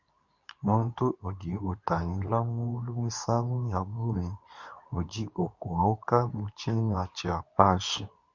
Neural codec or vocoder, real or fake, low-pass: codec, 24 kHz, 0.9 kbps, WavTokenizer, medium speech release version 2; fake; 7.2 kHz